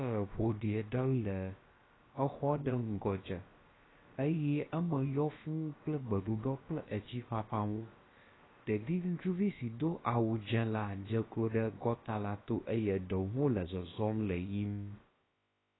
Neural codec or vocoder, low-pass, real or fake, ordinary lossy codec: codec, 16 kHz, about 1 kbps, DyCAST, with the encoder's durations; 7.2 kHz; fake; AAC, 16 kbps